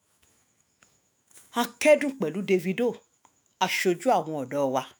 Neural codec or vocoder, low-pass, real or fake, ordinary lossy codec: autoencoder, 48 kHz, 128 numbers a frame, DAC-VAE, trained on Japanese speech; none; fake; none